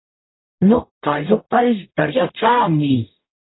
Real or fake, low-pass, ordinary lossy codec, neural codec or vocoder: fake; 7.2 kHz; AAC, 16 kbps; codec, 44.1 kHz, 0.9 kbps, DAC